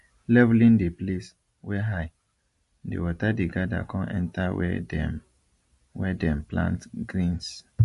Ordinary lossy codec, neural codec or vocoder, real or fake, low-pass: MP3, 48 kbps; none; real; 14.4 kHz